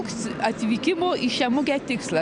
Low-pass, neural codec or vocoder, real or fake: 9.9 kHz; none; real